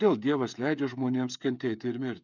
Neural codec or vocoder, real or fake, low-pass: codec, 16 kHz, 8 kbps, FreqCodec, smaller model; fake; 7.2 kHz